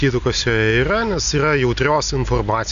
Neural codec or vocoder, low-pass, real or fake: none; 7.2 kHz; real